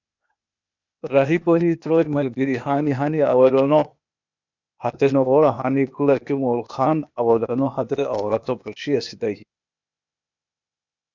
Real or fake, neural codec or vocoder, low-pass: fake; codec, 16 kHz, 0.8 kbps, ZipCodec; 7.2 kHz